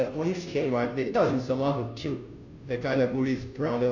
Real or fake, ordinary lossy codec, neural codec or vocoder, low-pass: fake; none; codec, 16 kHz, 0.5 kbps, FunCodec, trained on Chinese and English, 25 frames a second; 7.2 kHz